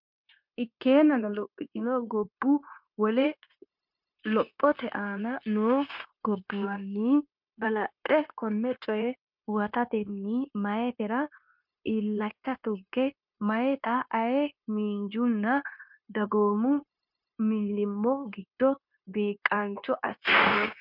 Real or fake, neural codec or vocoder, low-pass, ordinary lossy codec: fake; codec, 16 kHz, 0.9 kbps, LongCat-Audio-Codec; 5.4 kHz; MP3, 48 kbps